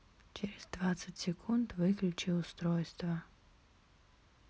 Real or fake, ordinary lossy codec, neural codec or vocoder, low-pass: real; none; none; none